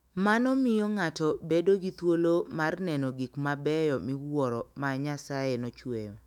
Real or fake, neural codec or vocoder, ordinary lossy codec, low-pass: fake; autoencoder, 48 kHz, 128 numbers a frame, DAC-VAE, trained on Japanese speech; none; 19.8 kHz